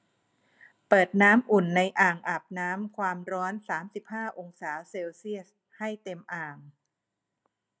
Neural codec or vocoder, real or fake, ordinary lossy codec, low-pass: none; real; none; none